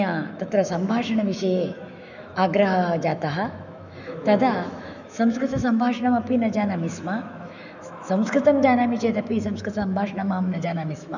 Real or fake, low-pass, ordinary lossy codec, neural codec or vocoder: fake; 7.2 kHz; none; autoencoder, 48 kHz, 128 numbers a frame, DAC-VAE, trained on Japanese speech